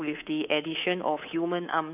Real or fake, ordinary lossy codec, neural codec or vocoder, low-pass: fake; none; codec, 24 kHz, 3.1 kbps, DualCodec; 3.6 kHz